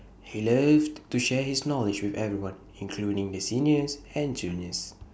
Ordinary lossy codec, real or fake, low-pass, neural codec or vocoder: none; real; none; none